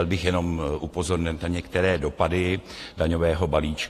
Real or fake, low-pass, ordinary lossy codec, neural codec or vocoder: fake; 14.4 kHz; AAC, 48 kbps; vocoder, 48 kHz, 128 mel bands, Vocos